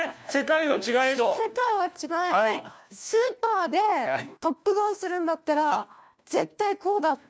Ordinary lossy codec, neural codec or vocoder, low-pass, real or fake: none; codec, 16 kHz, 1 kbps, FunCodec, trained on LibriTTS, 50 frames a second; none; fake